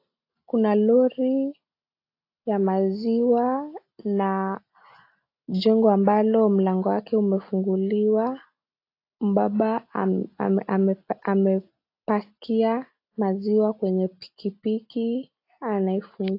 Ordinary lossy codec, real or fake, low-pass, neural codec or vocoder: AAC, 32 kbps; real; 5.4 kHz; none